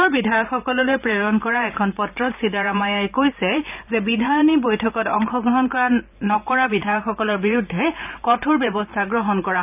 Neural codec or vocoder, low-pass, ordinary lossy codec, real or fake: vocoder, 44.1 kHz, 128 mel bands every 512 samples, BigVGAN v2; 3.6 kHz; none; fake